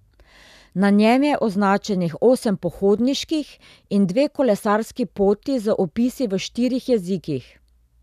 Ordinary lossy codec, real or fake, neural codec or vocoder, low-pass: none; real; none; 14.4 kHz